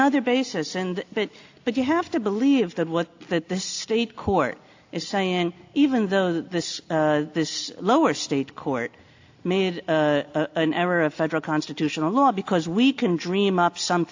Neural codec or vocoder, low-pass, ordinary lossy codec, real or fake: none; 7.2 kHz; AAC, 48 kbps; real